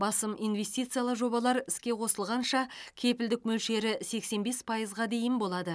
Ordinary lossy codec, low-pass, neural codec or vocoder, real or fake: none; none; none; real